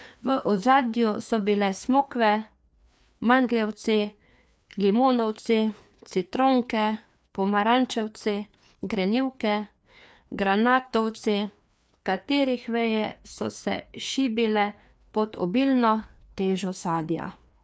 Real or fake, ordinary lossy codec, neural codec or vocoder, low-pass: fake; none; codec, 16 kHz, 2 kbps, FreqCodec, larger model; none